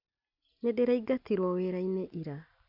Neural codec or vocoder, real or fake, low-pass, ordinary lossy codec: none; real; 5.4 kHz; none